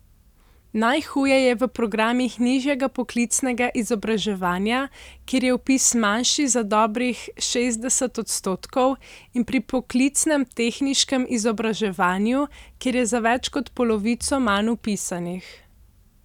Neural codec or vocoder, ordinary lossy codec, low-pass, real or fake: none; none; 19.8 kHz; real